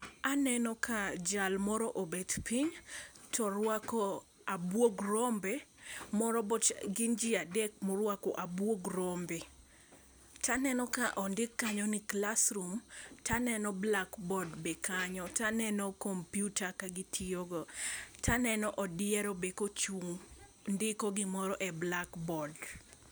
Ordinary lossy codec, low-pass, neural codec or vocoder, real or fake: none; none; none; real